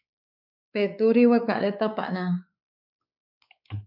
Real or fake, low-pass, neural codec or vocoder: fake; 5.4 kHz; codec, 16 kHz, 4 kbps, X-Codec, WavLM features, trained on Multilingual LibriSpeech